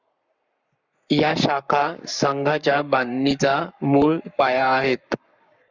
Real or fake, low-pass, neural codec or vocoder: fake; 7.2 kHz; codec, 44.1 kHz, 7.8 kbps, Pupu-Codec